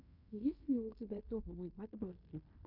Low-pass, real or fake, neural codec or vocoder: 5.4 kHz; fake; codec, 16 kHz in and 24 kHz out, 0.9 kbps, LongCat-Audio-Codec, fine tuned four codebook decoder